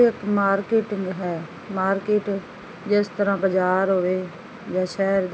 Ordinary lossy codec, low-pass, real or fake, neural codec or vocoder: none; none; real; none